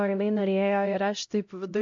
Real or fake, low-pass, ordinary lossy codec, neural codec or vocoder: fake; 7.2 kHz; AAC, 64 kbps; codec, 16 kHz, 0.5 kbps, X-Codec, HuBERT features, trained on LibriSpeech